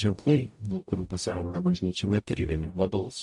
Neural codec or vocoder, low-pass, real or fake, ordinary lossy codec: codec, 44.1 kHz, 0.9 kbps, DAC; 10.8 kHz; fake; AAC, 64 kbps